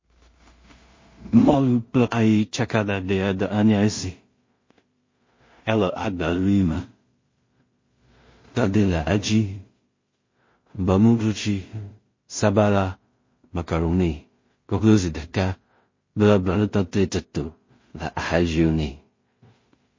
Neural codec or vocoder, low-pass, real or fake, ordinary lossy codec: codec, 16 kHz in and 24 kHz out, 0.4 kbps, LongCat-Audio-Codec, two codebook decoder; 7.2 kHz; fake; MP3, 32 kbps